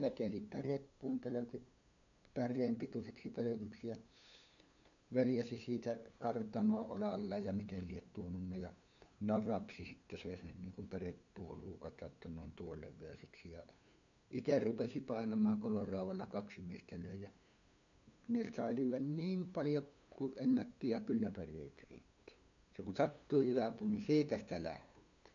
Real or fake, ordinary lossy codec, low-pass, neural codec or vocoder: fake; none; 7.2 kHz; codec, 16 kHz, 2 kbps, FunCodec, trained on LibriTTS, 25 frames a second